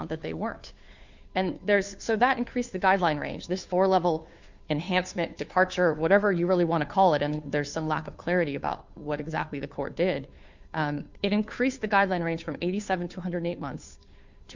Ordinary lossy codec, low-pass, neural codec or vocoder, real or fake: Opus, 64 kbps; 7.2 kHz; codec, 16 kHz, 2 kbps, FunCodec, trained on Chinese and English, 25 frames a second; fake